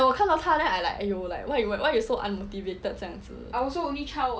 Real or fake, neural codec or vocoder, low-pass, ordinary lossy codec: real; none; none; none